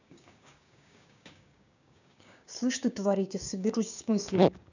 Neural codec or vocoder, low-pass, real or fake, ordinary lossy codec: codec, 16 kHz, 6 kbps, DAC; 7.2 kHz; fake; none